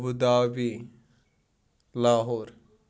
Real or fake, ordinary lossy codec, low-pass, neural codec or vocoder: real; none; none; none